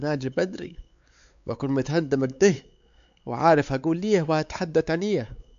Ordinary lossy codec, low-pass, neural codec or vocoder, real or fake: AAC, 96 kbps; 7.2 kHz; codec, 16 kHz, 4 kbps, X-Codec, WavLM features, trained on Multilingual LibriSpeech; fake